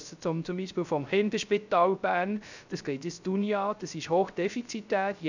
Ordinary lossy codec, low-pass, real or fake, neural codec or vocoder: none; 7.2 kHz; fake; codec, 16 kHz, 0.3 kbps, FocalCodec